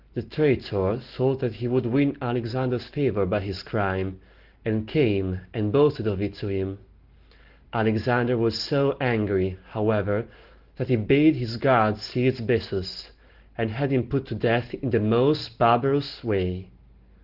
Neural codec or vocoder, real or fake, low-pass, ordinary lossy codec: none; real; 5.4 kHz; Opus, 16 kbps